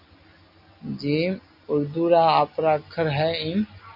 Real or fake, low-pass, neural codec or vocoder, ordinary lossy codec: real; 5.4 kHz; none; AAC, 48 kbps